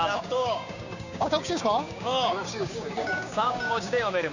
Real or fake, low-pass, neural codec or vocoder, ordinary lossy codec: fake; 7.2 kHz; codec, 16 kHz, 6 kbps, DAC; none